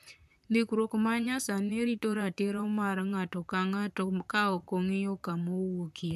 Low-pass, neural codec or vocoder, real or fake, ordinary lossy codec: 14.4 kHz; vocoder, 44.1 kHz, 128 mel bands, Pupu-Vocoder; fake; AAC, 96 kbps